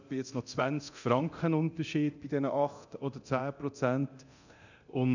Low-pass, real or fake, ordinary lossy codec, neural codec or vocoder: 7.2 kHz; fake; none; codec, 24 kHz, 0.9 kbps, DualCodec